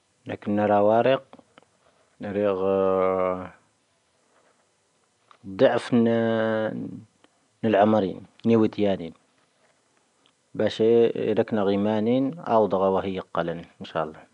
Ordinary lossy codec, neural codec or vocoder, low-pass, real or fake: none; none; 10.8 kHz; real